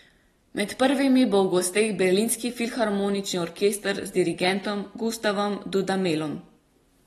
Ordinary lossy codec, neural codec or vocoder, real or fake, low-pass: AAC, 32 kbps; none; real; 19.8 kHz